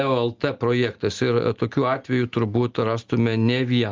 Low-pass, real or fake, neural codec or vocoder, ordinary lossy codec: 7.2 kHz; real; none; Opus, 32 kbps